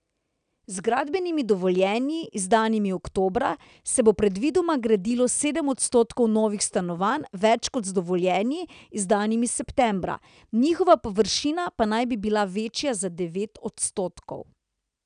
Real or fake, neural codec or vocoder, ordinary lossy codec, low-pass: real; none; none; 9.9 kHz